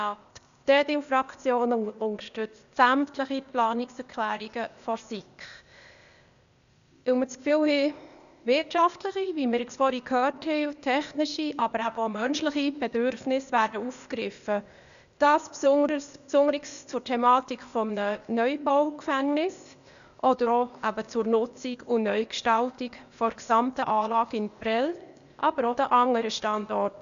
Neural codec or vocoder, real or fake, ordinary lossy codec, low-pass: codec, 16 kHz, 0.8 kbps, ZipCodec; fake; none; 7.2 kHz